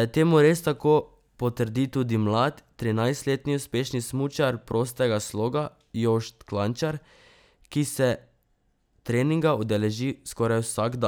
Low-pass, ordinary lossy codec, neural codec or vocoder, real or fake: none; none; none; real